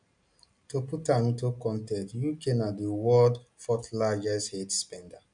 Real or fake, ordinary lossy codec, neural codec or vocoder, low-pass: real; MP3, 96 kbps; none; 9.9 kHz